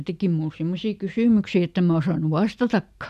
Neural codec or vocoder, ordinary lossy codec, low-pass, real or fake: none; none; 14.4 kHz; real